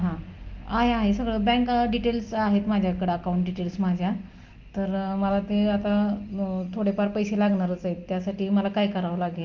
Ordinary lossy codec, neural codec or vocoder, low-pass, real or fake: Opus, 32 kbps; none; 7.2 kHz; real